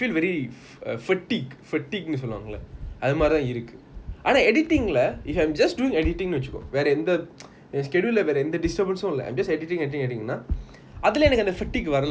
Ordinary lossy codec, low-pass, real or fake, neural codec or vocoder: none; none; real; none